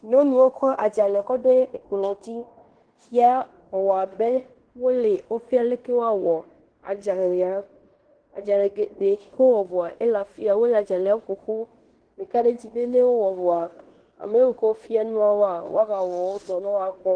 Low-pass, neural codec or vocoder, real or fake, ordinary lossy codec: 9.9 kHz; codec, 16 kHz in and 24 kHz out, 0.9 kbps, LongCat-Audio-Codec, fine tuned four codebook decoder; fake; Opus, 16 kbps